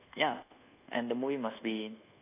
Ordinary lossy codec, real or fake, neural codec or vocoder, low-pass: AAC, 24 kbps; fake; codec, 24 kHz, 1.2 kbps, DualCodec; 3.6 kHz